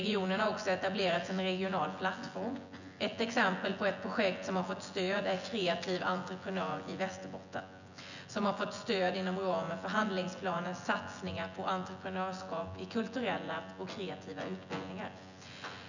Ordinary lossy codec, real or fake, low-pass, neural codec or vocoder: none; fake; 7.2 kHz; vocoder, 24 kHz, 100 mel bands, Vocos